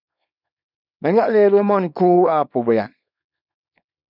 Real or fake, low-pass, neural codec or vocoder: fake; 5.4 kHz; codec, 24 kHz, 0.9 kbps, WavTokenizer, small release